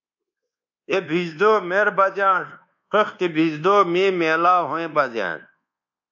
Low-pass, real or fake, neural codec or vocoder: 7.2 kHz; fake; codec, 24 kHz, 1.2 kbps, DualCodec